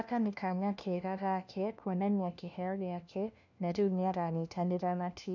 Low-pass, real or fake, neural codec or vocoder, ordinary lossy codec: 7.2 kHz; fake; codec, 16 kHz, 1 kbps, FunCodec, trained on LibriTTS, 50 frames a second; none